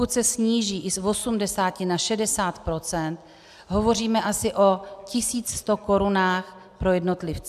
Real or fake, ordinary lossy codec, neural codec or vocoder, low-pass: real; AAC, 96 kbps; none; 14.4 kHz